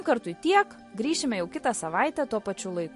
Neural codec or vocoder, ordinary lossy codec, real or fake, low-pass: none; MP3, 48 kbps; real; 14.4 kHz